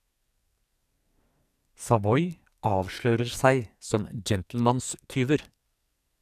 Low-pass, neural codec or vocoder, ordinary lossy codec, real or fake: 14.4 kHz; codec, 44.1 kHz, 2.6 kbps, SNAC; none; fake